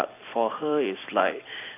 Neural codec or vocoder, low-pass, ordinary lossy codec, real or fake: vocoder, 44.1 kHz, 128 mel bands every 256 samples, BigVGAN v2; 3.6 kHz; AAC, 24 kbps; fake